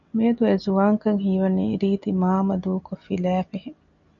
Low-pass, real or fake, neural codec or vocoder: 7.2 kHz; real; none